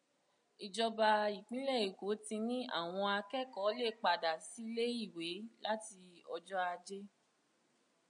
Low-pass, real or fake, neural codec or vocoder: 9.9 kHz; real; none